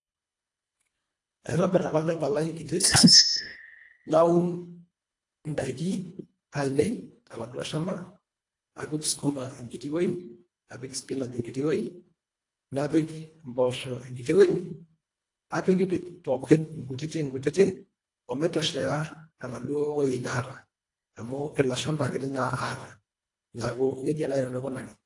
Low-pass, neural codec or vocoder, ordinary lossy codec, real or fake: 10.8 kHz; codec, 24 kHz, 1.5 kbps, HILCodec; AAC, 48 kbps; fake